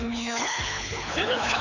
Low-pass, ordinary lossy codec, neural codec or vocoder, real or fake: 7.2 kHz; none; codec, 24 kHz, 6 kbps, HILCodec; fake